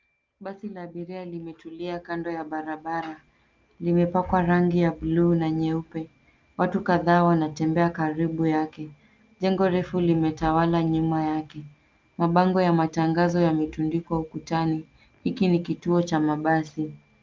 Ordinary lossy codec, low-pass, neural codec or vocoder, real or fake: Opus, 24 kbps; 7.2 kHz; none; real